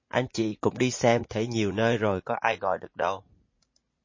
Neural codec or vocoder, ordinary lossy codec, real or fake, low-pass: vocoder, 44.1 kHz, 80 mel bands, Vocos; MP3, 32 kbps; fake; 7.2 kHz